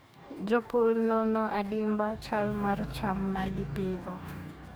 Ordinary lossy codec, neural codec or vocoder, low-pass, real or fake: none; codec, 44.1 kHz, 2.6 kbps, DAC; none; fake